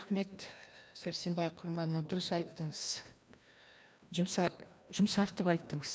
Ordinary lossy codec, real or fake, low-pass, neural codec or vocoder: none; fake; none; codec, 16 kHz, 1 kbps, FreqCodec, larger model